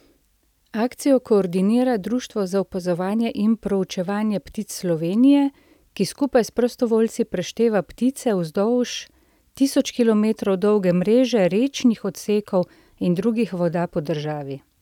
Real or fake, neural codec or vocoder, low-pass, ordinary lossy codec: real; none; 19.8 kHz; none